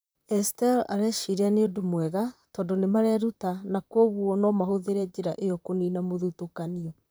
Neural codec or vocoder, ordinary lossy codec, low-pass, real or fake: vocoder, 44.1 kHz, 128 mel bands, Pupu-Vocoder; none; none; fake